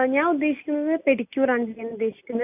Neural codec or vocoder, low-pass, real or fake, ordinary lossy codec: none; 3.6 kHz; real; AAC, 32 kbps